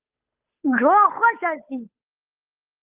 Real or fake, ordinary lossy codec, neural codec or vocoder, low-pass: fake; Opus, 24 kbps; codec, 16 kHz, 8 kbps, FunCodec, trained on Chinese and English, 25 frames a second; 3.6 kHz